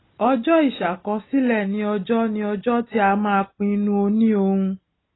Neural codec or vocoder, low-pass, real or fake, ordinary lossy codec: none; 7.2 kHz; real; AAC, 16 kbps